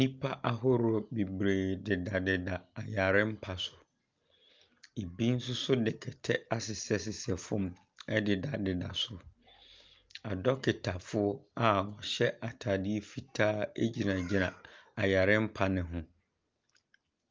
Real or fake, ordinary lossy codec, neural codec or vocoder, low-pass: real; Opus, 24 kbps; none; 7.2 kHz